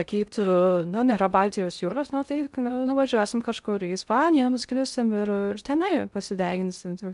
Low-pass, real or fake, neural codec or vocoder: 10.8 kHz; fake; codec, 16 kHz in and 24 kHz out, 0.6 kbps, FocalCodec, streaming, 2048 codes